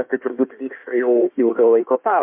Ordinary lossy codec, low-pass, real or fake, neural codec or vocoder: MP3, 32 kbps; 3.6 kHz; fake; codec, 16 kHz in and 24 kHz out, 1.1 kbps, FireRedTTS-2 codec